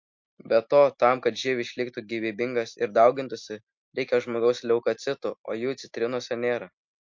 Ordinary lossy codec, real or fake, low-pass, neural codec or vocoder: MP3, 48 kbps; real; 7.2 kHz; none